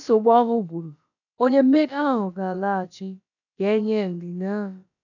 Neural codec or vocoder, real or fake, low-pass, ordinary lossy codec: codec, 16 kHz, about 1 kbps, DyCAST, with the encoder's durations; fake; 7.2 kHz; none